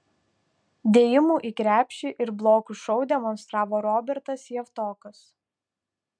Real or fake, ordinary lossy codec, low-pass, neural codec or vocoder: real; MP3, 96 kbps; 9.9 kHz; none